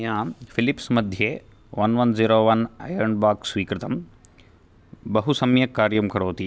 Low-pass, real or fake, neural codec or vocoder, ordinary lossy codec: none; real; none; none